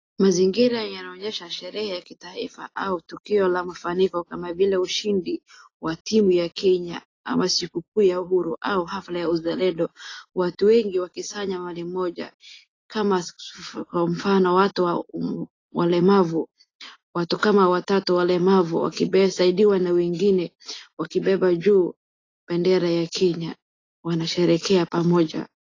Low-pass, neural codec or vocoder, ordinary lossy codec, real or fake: 7.2 kHz; none; AAC, 32 kbps; real